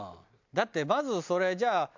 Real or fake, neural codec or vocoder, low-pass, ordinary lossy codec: real; none; 7.2 kHz; MP3, 64 kbps